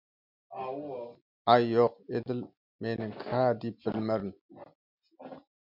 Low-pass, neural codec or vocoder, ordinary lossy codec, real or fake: 5.4 kHz; none; MP3, 48 kbps; real